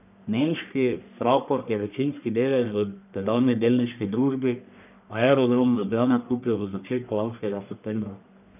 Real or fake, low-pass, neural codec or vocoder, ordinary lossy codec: fake; 3.6 kHz; codec, 44.1 kHz, 1.7 kbps, Pupu-Codec; none